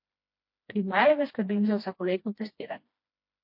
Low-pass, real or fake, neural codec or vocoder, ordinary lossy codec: 5.4 kHz; fake; codec, 16 kHz, 1 kbps, FreqCodec, smaller model; MP3, 32 kbps